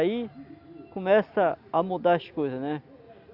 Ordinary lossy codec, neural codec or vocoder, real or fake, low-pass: Opus, 64 kbps; none; real; 5.4 kHz